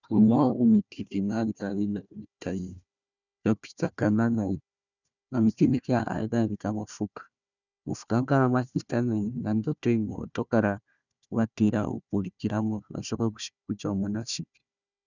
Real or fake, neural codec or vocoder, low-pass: fake; codec, 16 kHz, 1 kbps, FunCodec, trained on Chinese and English, 50 frames a second; 7.2 kHz